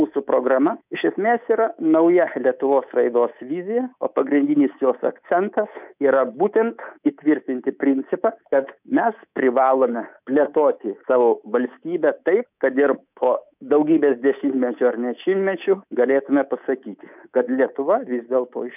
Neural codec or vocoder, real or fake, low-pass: codec, 24 kHz, 3.1 kbps, DualCodec; fake; 3.6 kHz